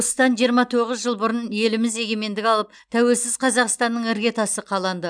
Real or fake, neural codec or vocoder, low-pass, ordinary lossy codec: real; none; 9.9 kHz; none